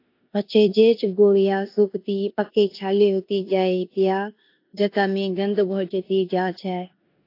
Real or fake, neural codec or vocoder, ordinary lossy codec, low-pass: fake; codec, 16 kHz in and 24 kHz out, 0.9 kbps, LongCat-Audio-Codec, four codebook decoder; AAC, 32 kbps; 5.4 kHz